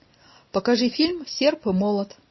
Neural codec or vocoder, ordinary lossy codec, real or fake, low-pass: none; MP3, 24 kbps; real; 7.2 kHz